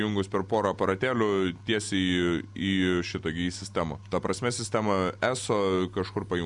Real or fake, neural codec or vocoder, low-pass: real; none; 10.8 kHz